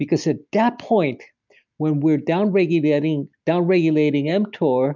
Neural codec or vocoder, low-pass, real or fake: none; 7.2 kHz; real